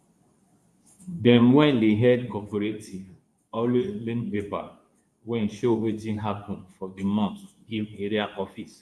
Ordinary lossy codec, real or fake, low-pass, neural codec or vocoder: none; fake; none; codec, 24 kHz, 0.9 kbps, WavTokenizer, medium speech release version 2